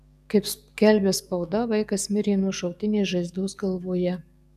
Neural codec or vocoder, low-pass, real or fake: codec, 44.1 kHz, 7.8 kbps, DAC; 14.4 kHz; fake